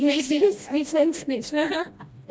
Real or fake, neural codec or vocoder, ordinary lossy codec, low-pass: fake; codec, 16 kHz, 1 kbps, FreqCodec, smaller model; none; none